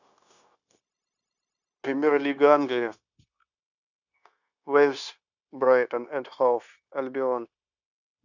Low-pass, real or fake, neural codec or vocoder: 7.2 kHz; fake; codec, 16 kHz, 0.9 kbps, LongCat-Audio-Codec